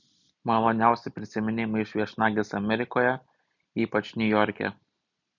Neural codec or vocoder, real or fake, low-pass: none; real; 7.2 kHz